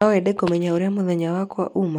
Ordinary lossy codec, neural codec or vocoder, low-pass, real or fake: none; none; 19.8 kHz; real